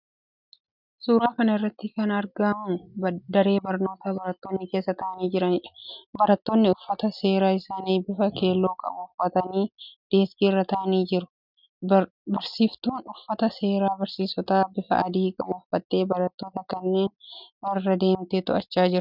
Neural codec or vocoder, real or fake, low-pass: none; real; 5.4 kHz